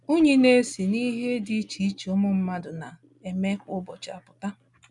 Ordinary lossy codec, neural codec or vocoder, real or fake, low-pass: none; none; real; 10.8 kHz